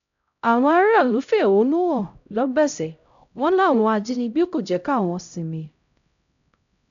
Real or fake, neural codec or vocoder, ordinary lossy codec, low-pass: fake; codec, 16 kHz, 0.5 kbps, X-Codec, HuBERT features, trained on LibriSpeech; none; 7.2 kHz